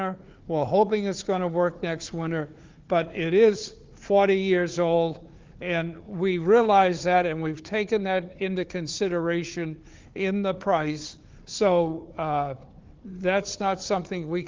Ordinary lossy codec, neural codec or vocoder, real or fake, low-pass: Opus, 32 kbps; codec, 16 kHz, 4 kbps, FunCodec, trained on Chinese and English, 50 frames a second; fake; 7.2 kHz